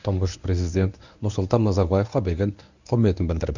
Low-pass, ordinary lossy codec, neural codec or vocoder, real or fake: 7.2 kHz; none; codec, 24 kHz, 0.9 kbps, WavTokenizer, medium speech release version 1; fake